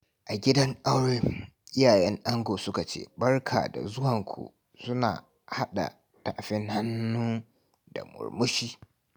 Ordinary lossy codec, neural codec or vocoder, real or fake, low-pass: none; none; real; none